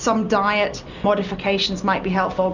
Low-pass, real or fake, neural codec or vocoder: 7.2 kHz; real; none